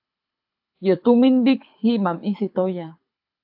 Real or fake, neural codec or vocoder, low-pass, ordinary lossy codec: fake; codec, 24 kHz, 6 kbps, HILCodec; 5.4 kHz; AAC, 48 kbps